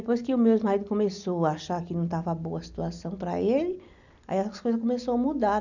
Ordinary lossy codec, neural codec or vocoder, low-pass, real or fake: none; none; 7.2 kHz; real